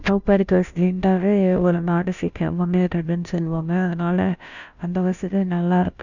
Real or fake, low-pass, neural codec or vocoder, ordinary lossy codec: fake; 7.2 kHz; codec, 16 kHz, 0.5 kbps, FunCodec, trained on Chinese and English, 25 frames a second; none